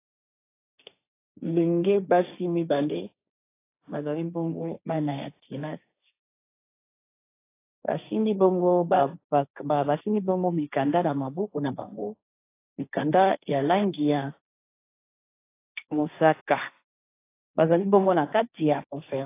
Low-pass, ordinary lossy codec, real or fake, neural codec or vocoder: 3.6 kHz; AAC, 24 kbps; fake; codec, 16 kHz, 1.1 kbps, Voila-Tokenizer